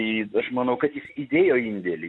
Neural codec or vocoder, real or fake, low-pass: none; real; 10.8 kHz